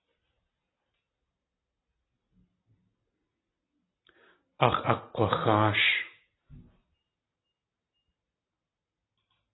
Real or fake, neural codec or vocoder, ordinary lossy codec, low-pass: real; none; AAC, 16 kbps; 7.2 kHz